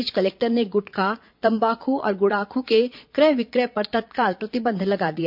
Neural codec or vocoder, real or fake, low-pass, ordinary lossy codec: vocoder, 22.05 kHz, 80 mel bands, Vocos; fake; 5.4 kHz; MP3, 48 kbps